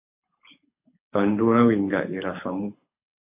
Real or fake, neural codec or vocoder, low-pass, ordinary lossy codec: fake; codec, 24 kHz, 6 kbps, HILCodec; 3.6 kHz; MP3, 24 kbps